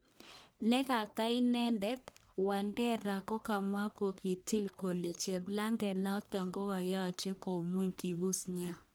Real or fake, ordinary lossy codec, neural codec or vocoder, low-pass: fake; none; codec, 44.1 kHz, 1.7 kbps, Pupu-Codec; none